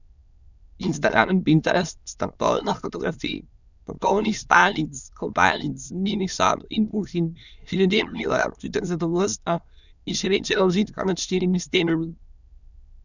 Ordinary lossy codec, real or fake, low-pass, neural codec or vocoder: none; fake; 7.2 kHz; autoencoder, 22.05 kHz, a latent of 192 numbers a frame, VITS, trained on many speakers